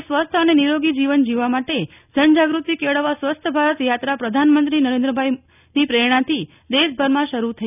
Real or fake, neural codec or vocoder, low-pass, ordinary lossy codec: real; none; 3.6 kHz; none